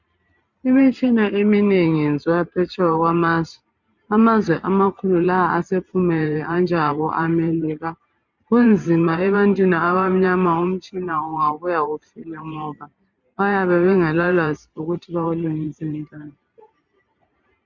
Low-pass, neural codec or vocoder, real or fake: 7.2 kHz; vocoder, 44.1 kHz, 128 mel bands every 512 samples, BigVGAN v2; fake